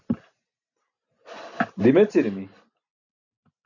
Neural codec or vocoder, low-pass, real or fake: none; 7.2 kHz; real